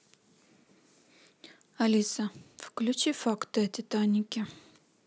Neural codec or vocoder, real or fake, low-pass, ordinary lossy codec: none; real; none; none